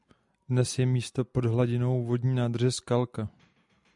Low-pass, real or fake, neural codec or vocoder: 10.8 kHz; real; none